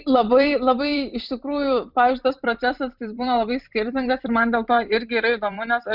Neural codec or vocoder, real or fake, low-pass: vocoder, 44.1 kHz, 128 mel bands every 256 samples, BigVGAN v2; fake; 5.4 kHz